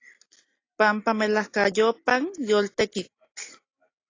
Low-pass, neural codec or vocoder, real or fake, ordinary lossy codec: 7.2 kHz; none; real; AAC, 32 kbps